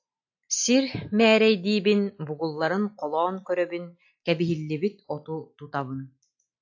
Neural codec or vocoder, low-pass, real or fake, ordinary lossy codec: none; 7.2 kHz; real; AAC, 48 kbps